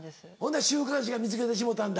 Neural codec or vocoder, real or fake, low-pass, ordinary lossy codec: none; real; none; none